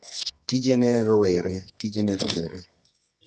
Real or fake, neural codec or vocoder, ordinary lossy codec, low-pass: fake; codec, 24 kHz, 0.9 kbps, WavTokenizer, medium music audio release; none; none